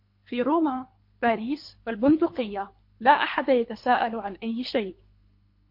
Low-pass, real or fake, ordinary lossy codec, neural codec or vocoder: 5.4 kHz; fake; MP3, 32 kbps; codec, 24 kHz, 3 kbps, HILCodec